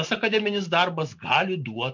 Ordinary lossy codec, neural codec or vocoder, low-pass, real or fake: MP3, 48 kbps; none; 7.2 kHz; real